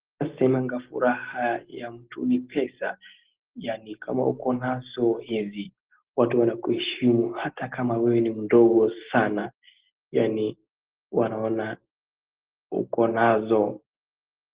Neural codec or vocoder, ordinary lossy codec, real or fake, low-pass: none; Opus, 16 kbps; real; 3.6 kHz